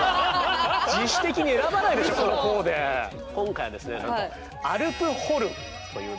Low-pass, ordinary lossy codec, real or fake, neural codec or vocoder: none; none; real; none